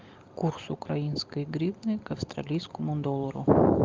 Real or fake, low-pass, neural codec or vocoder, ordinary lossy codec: real; 7.2 kHz; none; Opus, 32 kbps